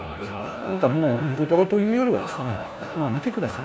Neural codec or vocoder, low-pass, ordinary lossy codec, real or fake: codec, 16 kHz, 1 kbps, FunCodec, trained on LibriTTS, 50 frames a second; none; none; fake